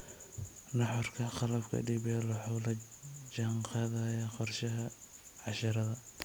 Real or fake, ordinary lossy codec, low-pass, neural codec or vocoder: real; none; none; none